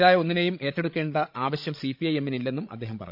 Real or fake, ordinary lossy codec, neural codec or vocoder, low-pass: fake; MP3, 48 kbps; codec, 16 kHz, 16 kbps, FreqCodec, larger model; 5.4 kHz